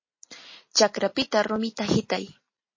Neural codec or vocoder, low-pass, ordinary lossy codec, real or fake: none; 7.2 kHz; MP3, 32 kbps; real